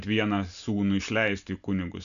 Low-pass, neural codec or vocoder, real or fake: 7.2 kHz; none; real